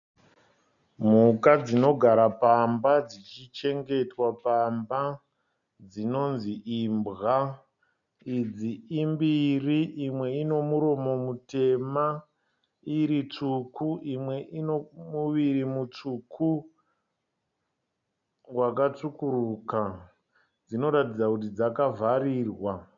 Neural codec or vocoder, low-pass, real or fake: none; 7.2 kHz; real